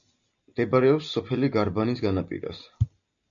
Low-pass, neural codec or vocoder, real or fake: 7.2 kHz; none; real